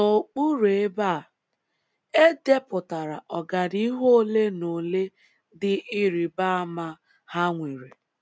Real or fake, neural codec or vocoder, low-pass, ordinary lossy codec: real; none; none; none